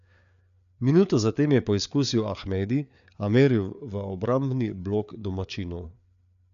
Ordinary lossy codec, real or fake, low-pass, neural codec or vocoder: none; fake; 7.2 kHz; codec, 16 kHz, 4 kbps, FreqCodec, larger model